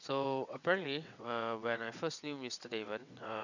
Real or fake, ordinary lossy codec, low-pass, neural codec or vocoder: fake; none; 7.2 kHz; vocoder, 22.05 kHz, 80 mel bands, WaveNeXt